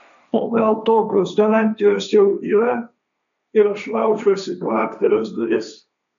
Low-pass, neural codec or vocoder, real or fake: 7.2 kHz; codec, 16 kHz, 1.1 kbps, Voila-Tokenizer; fake